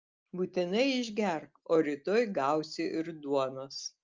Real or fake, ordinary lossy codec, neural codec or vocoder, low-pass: real; Opus, 24 kbps; none; 7.2 kHz